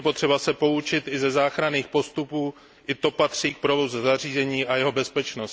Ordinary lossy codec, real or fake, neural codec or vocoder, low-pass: none; real; none; none